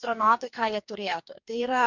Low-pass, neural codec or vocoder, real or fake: 7.2 kHz; codec, 44.1 kHz, 2.6 kbps, DAC; fake